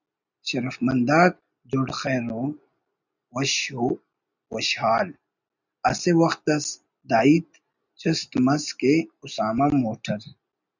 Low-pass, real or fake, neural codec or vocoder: 7.2 kHz; real; none